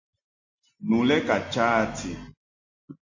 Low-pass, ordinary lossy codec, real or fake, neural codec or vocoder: 7.2 kHz; AAC, 48 kbps; real; none